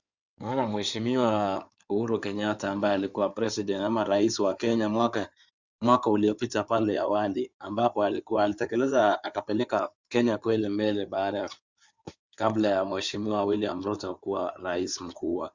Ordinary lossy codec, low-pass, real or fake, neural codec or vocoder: Opus, 64 kbps; 7.2 kHz; fake; codec, 16 kHz in and 24 kHz out, 2.2 kbps, FireRedTTS-2 codec